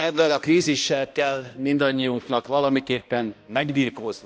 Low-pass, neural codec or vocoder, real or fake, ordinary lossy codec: none; codec, 16 kHz, 1 kbps, X-Codec, HuBERT features, trained on balanced general audio; fake; none